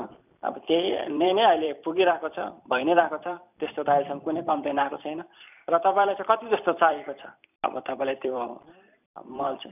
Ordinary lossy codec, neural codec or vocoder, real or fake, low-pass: none; vocoder, 44.1 kHz, 128 mel bands every 256 samples, BigVGAN v2; fake; 3.6 kHz